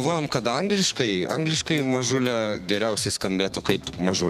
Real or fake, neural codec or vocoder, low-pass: fake; codec, 32 kHz, 1.9 kbps, SNAC; 14.4 kHz